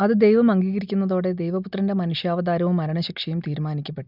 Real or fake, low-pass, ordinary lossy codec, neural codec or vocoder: real; 5.4 kHz; none; none